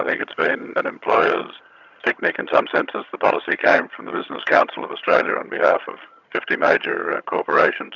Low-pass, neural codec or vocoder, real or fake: 7.2 kHz; vocoder, 22.05 kHz, 80 mel bands, HiFi-GAN; fake